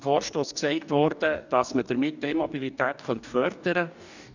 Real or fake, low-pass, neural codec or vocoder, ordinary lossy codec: fake; 7.2 kHz; codec, 44.1 kHz, 2.6 kbps, DAC; none